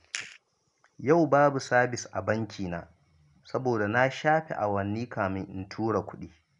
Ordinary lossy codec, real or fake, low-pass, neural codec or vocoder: none; real; 10.8 kHz; none